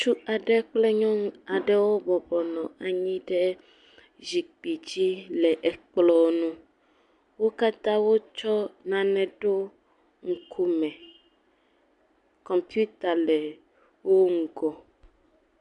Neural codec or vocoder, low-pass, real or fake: none; 10.8 kHz; real